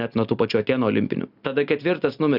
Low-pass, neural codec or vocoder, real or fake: 5.4 kHz; none; real